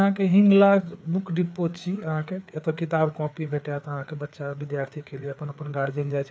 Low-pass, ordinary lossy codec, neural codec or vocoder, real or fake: none; none; codec, 16 kHz, 4 kbps, FreqCodec, larger model; fake